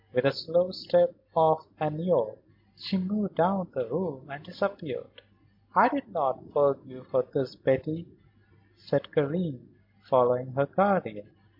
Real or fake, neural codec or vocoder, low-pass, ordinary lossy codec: real; none; 5.4 kHz; MP3, 48 kbps